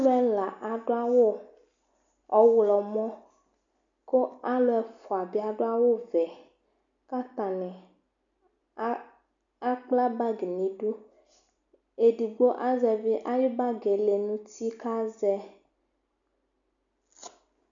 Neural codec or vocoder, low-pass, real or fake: none; 7.2 kHz; real